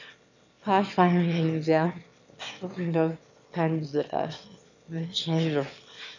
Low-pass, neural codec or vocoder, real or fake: 7.2 kHz; autoencoder, 22.05 kHz, a latent of 192 numbers a frame, VITS, trained on one speaker; fake